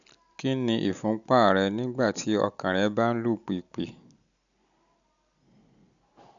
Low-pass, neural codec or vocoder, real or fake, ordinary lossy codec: 7.2 kHz; none; real; none